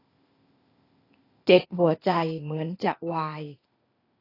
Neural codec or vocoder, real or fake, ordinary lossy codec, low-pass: codec, 16 kHz, 0.8 kbps, ZipCodec; fake; AAC, 24 kbps; 5.4 kHz